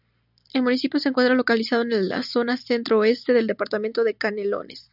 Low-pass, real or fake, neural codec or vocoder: 5.4 kHz; real; none